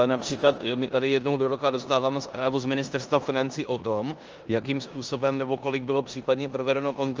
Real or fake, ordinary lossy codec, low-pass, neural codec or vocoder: fake; Opus, 24 kbps; 7.2 kHz; codec, 16 kHz in and 24 kHz out, 0.9 kbps, LongCat-Audio-Codec, four codebook decoder